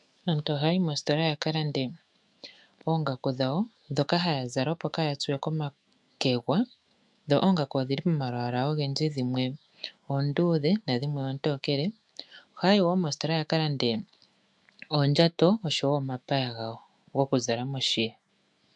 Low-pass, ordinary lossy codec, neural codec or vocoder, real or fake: 10.8 kHz; MP3, 96 kbps; autoencoder, 48 kHz, 128 numbers a frame, DAC-VAE, trained on Japanese speech; fake